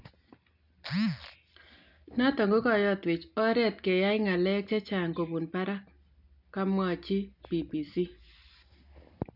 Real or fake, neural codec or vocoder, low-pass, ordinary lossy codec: real; none; 5.4 kHz; none